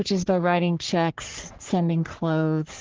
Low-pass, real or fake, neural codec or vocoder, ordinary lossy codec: 7.2 kHz; fake; codec, 44.1 kHz, 3.4 kbps, Pupu-Codec; Opus, 16 kbps